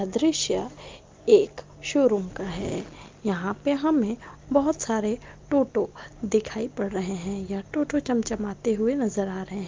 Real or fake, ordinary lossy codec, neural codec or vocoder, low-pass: real; Opus, 32 kbps; none; 7.2 kHz